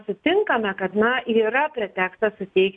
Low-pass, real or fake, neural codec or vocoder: 9.9 kHz; real; none